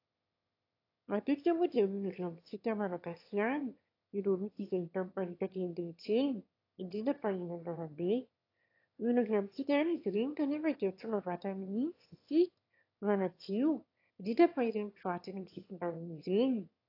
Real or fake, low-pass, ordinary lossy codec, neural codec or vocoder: fake; 5.4 kHz; AAC, 32 kbps; autoencoder, 22.05 kHz, a latent of 192 numbers a frame, VITS, trained on one speaker